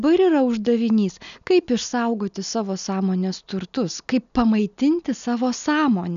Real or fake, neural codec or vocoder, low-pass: real; none; 7.2 kHz